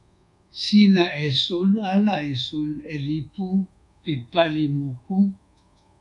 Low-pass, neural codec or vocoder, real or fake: 10.8 kHz; codec, 24 kHz, 1.2 kbps, DualCodec; fake